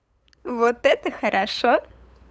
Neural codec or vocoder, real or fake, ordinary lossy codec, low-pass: codec, 16 kHz, 8 kbps, FunCodec, trained on LibriTTS, 25 frames a second; fake; none; none